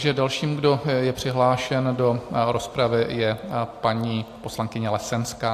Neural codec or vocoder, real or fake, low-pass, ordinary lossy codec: none; real; 14.4 kHz; AAC, 64 kbps